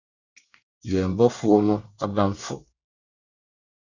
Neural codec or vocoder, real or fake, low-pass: codec, 24 kHz, 1 kbps, SNAC; fake; 7.2 kHz